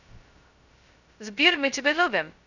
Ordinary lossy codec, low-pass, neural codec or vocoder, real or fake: none; 7.2 kHz; codec, 16 kHz, 0.2 kbps, FocalCodec; fake